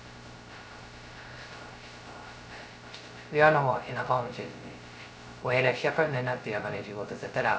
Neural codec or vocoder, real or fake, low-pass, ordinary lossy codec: codec, 16 kHz, 0.2 kbps, FocalCodec; fake; none; none